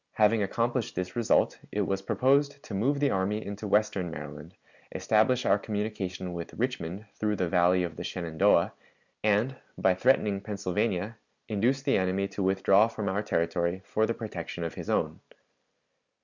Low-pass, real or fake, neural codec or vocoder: 7.2 kHz; real; none